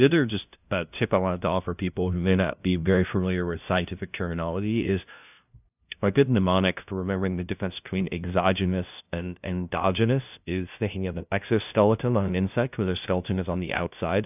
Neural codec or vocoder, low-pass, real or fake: codec, 16 kHz, 0.5 kbps, FunCodec, trained on LibriTTS, 25 frames a second; 3.6 kHz; fake